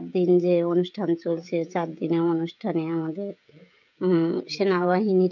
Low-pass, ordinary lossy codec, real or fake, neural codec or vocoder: 7.2 kHz; none; fake; codec, 16 kHz, 16 kbps, FreqCodec, smaller model